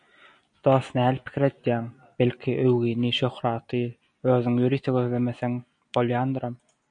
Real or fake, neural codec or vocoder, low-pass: real; none; 9.9 kHz